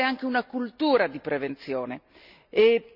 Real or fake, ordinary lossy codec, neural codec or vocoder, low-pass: real; none; none; 5.4 kHz